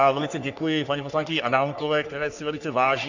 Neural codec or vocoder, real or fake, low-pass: codec, 44.1 kHz, 3.4 kbps, Pupu-Codec; fake; 7.2 kHz